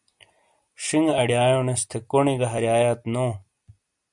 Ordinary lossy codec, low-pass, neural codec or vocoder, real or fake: MP3, 96 kbps; 10.8 kHz; none; real